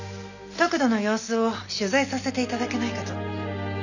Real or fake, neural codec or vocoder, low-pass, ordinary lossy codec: real; none; 7.2 kHz; none